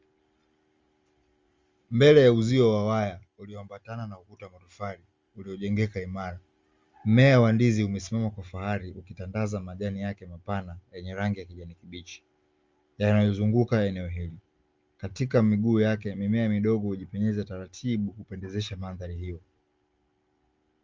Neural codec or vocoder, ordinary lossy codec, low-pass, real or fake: none; Opus, 32 kbps; 7.2 kHz; real